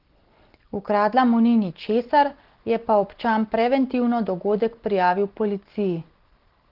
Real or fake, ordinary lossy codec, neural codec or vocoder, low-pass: real; Opus, 16 kbps; none; 5.4 kHz